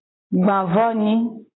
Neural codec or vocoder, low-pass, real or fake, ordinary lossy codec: none; 7.2 kHz; real; AAC, 16 kbps